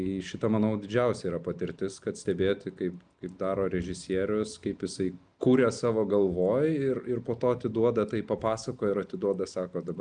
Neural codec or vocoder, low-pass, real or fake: none; 9.9 kHz; real